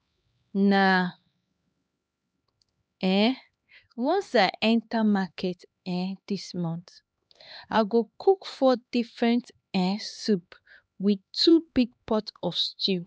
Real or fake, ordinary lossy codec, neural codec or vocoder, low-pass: fake; none; codec, 16 kHz, 2 kbps, X-Codec, HuBERT features, trained on LibriSpeech; none